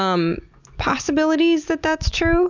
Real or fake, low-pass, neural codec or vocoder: real; 7.2 kHz; none